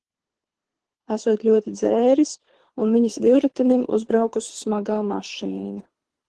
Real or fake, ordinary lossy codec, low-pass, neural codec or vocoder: fake; Opus, 16 kbps; 10.8 kHz; codec, 24 kHz, 3 kbps, HILCodec